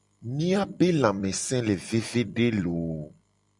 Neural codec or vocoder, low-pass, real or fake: vocoder, 44.1 kHz, 128 mel bands every 256 samples, BigVGAN v2; 10.8 kHz; fake